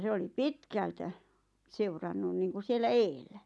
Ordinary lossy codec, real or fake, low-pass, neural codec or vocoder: none; real; none; none